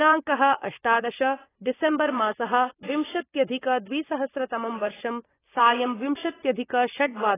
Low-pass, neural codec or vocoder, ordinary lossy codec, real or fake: 3.6 kHz; vocoder, 24 kHz, 100 mel bands, Vocos; AAC, 16 kbps; fake